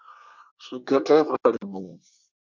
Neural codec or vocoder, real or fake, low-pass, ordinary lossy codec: codec, 24 kHz, 1 kbps, SNAC; fake; 7.2 kHz; MP3, 64 kbps